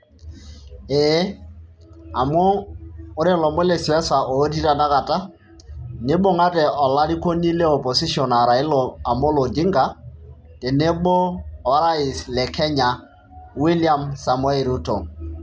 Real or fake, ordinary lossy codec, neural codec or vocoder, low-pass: real; none; none; none